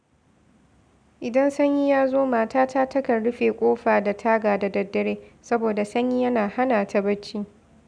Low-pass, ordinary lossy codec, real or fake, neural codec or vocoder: 9.9 kHz; none; real; none